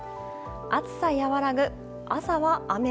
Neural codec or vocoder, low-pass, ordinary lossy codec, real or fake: none; none; none; real